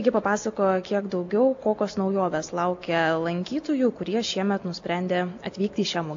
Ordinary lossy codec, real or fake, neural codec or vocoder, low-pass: AAC, 48 kbps; real; none; 7.2 kHz